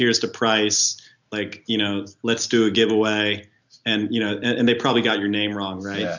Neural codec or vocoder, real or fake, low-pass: none; real; 7.2 kHz